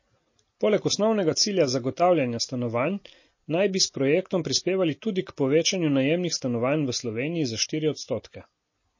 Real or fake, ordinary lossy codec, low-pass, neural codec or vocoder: real; MP3, 32 kbps; 7.2 kHz; none